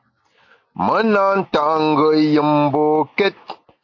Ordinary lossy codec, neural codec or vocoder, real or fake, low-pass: AAC, 48 kbps; none; real; 7.2 kHz